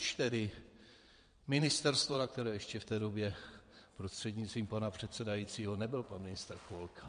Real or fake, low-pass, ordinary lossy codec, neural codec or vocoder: fake; 9.9 kHz; MP3, 48 kbps; vocoder, 22.05 kHz, 80 mel bands, WaveNeXt